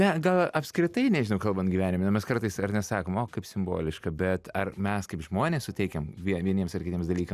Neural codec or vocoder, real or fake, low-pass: none; real; 14.4 kHz